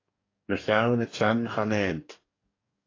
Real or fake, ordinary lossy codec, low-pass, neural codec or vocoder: fake; AAC, 32 kbps; 7.2 kHz; codec, 44.1 kHz, 2.6 kbps, DAC